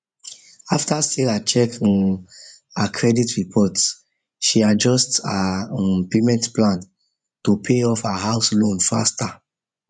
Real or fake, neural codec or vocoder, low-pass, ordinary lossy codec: fake; vocoder, 24 kHz, 100 mel bands, Vocos; 9.9 kHz; none